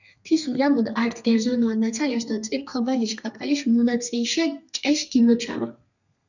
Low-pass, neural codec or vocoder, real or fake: 7.2 kHz; codec, 32 kHz, 1.9 kbps, SNAC; fake